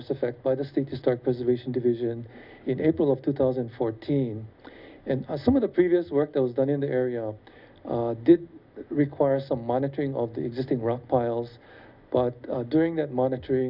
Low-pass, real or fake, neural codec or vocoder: 5.4 kHz; real; none